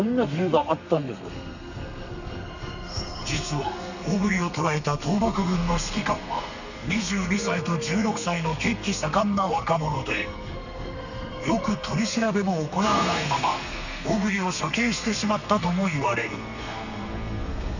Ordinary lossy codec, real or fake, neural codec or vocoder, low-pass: none; fake; codec, 32 kHz, 1.9 kbps, SNAC; 7.2 kHz